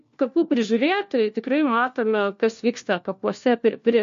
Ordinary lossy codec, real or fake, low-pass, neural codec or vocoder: MP3, 48 kbps; fake; 7.2 kHz; codec, 16 kHz, 1 kbps, FunCodec, trained on LibriTTS, 50 frames a second